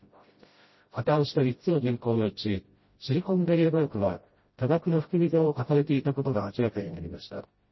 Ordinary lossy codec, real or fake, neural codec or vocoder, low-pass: MP3, 24 kbps; fake; codec, 16 kHz, 0.5 kbps, FreqCodec, smaller model; 7.2 kHz